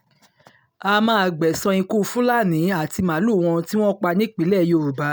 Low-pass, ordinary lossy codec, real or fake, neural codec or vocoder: none; none; real; none